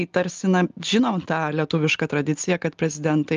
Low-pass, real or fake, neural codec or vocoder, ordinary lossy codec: 7.2 kHz; real; none; Opus, 32 kbps